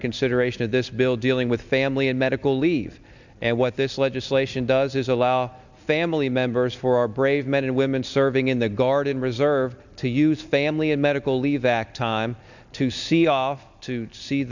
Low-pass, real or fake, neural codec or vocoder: 7.2 kHz; real; none